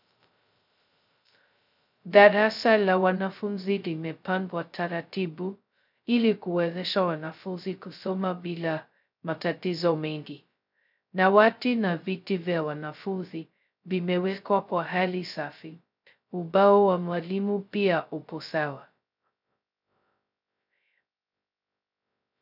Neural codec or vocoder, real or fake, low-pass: codec, 16 kHz, 0.2 kbps, FocalCodec; fake; 5.4 kHz